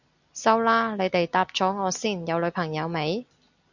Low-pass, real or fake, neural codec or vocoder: 7.2 kHz; real; none